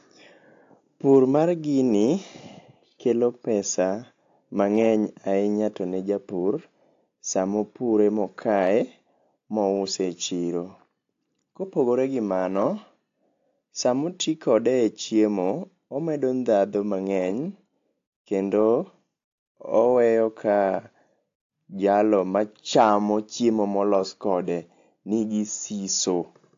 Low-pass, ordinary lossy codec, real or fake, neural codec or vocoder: 7.2 kHz; AAC, 48 kbps; real; none